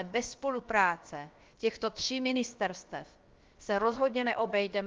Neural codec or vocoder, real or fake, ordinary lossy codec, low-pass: codec, 16 kHz, about 1 kbps, DyCAST, with the encoder's durations; fake; Opus, 32 kbps; 7.2 kHz